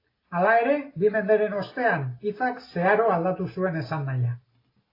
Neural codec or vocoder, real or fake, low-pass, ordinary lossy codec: none; real; 5.4 kHz; AAC, 24 kbps